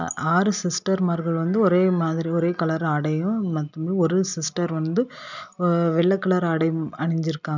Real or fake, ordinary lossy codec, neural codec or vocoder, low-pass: real; none; none; 7.2 kHz